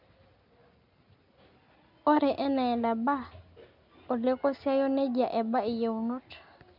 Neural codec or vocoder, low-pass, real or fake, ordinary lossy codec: none; 5.4 kHz; real; none